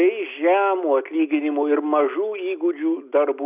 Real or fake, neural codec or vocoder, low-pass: real; none; 3.6 kHz